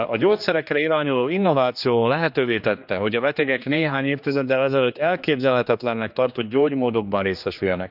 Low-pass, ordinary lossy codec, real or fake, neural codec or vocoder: 5.4 kHz; none; fake; codec, 16 kHz, 2 kbps, X-Codec, HuBERT features, trained on general audio